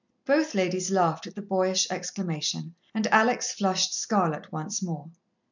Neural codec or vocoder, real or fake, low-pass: none; real; 7.2 kHz